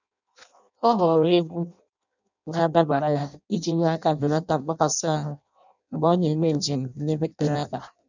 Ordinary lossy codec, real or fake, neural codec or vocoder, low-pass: none; fake; codec, 16 kHz in and 24 kHz out, 0.6 kbps, FireRedTTS-2 codec; 7.2 kHz